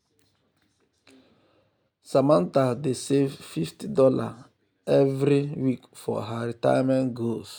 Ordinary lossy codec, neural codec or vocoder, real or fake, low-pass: none; none; real; none